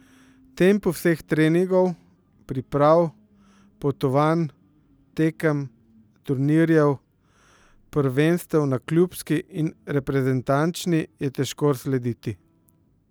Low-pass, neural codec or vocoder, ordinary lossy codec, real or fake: none; none; none; real